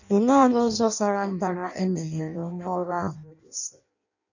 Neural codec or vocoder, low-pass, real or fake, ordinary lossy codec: codec, 16 kHz in and 24 kHz out, 0.6 kbps, FireRedTTS-2 codec; 7.2 kHz; fake; none